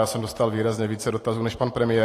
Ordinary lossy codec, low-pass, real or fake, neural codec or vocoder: AAC, 48 kbps; 14.4 kHz; fake; vocoder, 44.1 kHz, 128 mel bands every 512 samples, BigVGAN v2